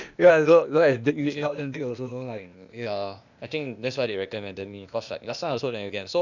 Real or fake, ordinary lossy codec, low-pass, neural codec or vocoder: fake; none; 7.2 kHz; codec, 16 kHz, 0.8 kbps, ZipCodec